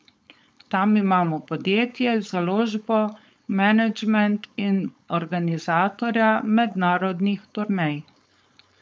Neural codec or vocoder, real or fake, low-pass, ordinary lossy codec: codec, 16 kHz, 4.8 kbps, FACodec; fake; none; none